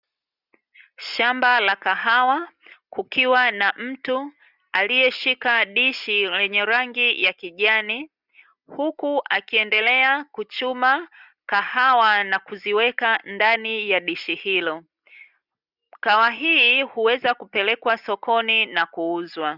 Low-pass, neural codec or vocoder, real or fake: 5.4 kHz; none; real